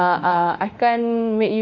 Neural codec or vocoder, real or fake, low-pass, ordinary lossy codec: autoencoder, 48 kHz, 32 numbers a frame, DAC-VAE, trained on Japanese speech; fake; 7.2 kHz; none